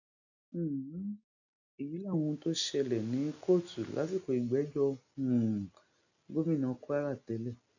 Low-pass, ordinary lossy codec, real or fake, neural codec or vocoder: 7.2 kHz; none; real; none